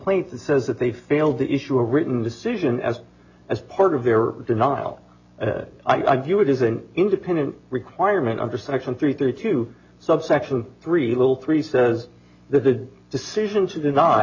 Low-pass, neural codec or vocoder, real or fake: 7.2 kHz; none; real